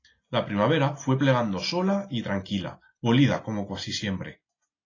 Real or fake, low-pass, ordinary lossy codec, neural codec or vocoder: real; 7.2 kHz; AAC, 32 kbps; none